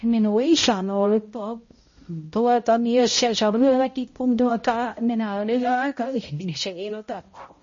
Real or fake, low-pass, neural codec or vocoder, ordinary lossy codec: fake; 7.2 kHz; codec, 16 kHz, 0.5 kbps, X-Codec, HuBERT features, trained on balanced general audio; MP3, 32 kbps